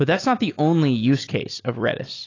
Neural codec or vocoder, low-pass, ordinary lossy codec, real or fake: none; 7.2 kHz; AAC, 32 kbps; real